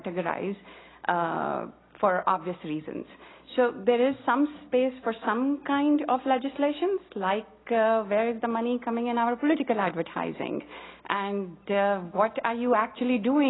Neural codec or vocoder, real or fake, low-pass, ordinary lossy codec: none; real; 7.2 kHz; AAC, 16 kbps